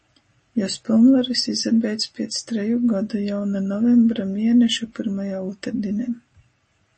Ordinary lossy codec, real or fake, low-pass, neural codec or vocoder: MP3, 32 kbps; real; 10.8 kHz; none